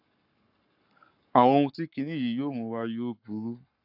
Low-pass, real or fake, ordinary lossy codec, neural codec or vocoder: 5.4 kHz; fake; AAC, 48 kbps; codec, 44.1 kHz, 7.8 kbps, Pupu-Codec